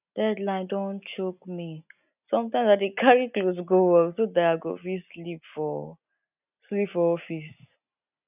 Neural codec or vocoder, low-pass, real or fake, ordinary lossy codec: none; 3.6 kHz; real; none